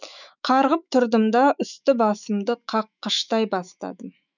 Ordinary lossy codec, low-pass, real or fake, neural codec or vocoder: none; 7.2 kHz; fake; autoencoder, 48 kHz, 128 numbers a frame, DAC-VAE, trained on Japanese speech